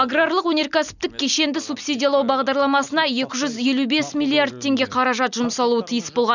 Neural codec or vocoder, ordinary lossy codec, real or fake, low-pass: none; none; real; 7.2 kHz